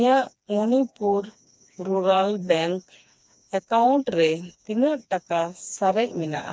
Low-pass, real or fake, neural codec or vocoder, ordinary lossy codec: none; fake; codec, 16 kHz, 2 kbps, FreqCodec, smaller model; none